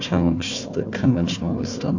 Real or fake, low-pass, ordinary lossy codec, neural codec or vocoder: fake; 7.2 kHz; AAC, 48 kbps; codec, 16 kHz, 1 kbps, FunCodec, trained on Chinese and English, 50 frames a second